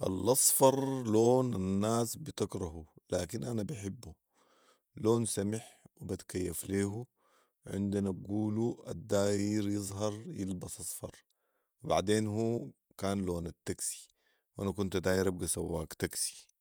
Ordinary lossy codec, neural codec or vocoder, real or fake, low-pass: none; vocoder, 48 kHz, 128 mel bands, Vocos; fake; none